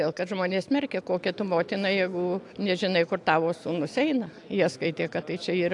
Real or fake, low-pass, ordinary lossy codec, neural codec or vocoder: real; 10.8 kHz; AAC, 64 kbps; none